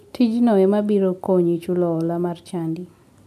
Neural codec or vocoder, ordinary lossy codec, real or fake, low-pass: none; MP3, 96 kbps; real; 14.4 kHz